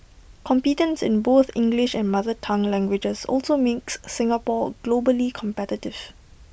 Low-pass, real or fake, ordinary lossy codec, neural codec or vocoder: none; real; none; none